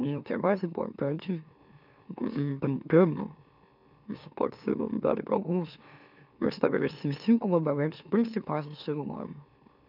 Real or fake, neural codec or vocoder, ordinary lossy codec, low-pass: fake; autoencoder, 44.1 kHz, a latent of 192 numbers a frame, MeloTTS; none; 5.4 kHz